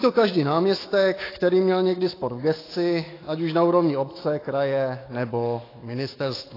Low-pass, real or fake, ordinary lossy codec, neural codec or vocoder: 5.4 kHz; fake; AAC, 24 kbps; autoencoder, 48 kHz, 128 numbers a frame, DAC-VAE, trained on Japanese speech